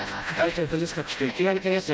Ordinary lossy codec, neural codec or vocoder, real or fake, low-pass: none; codec, 16 kHz, 0.5 kbps, FreqCodec, smaller model; fake; none